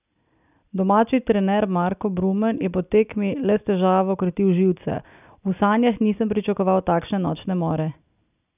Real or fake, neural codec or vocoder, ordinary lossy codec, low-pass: real; none; none; 3.6 kHz